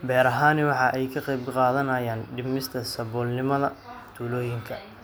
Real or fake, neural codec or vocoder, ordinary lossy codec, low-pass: real; none; none; none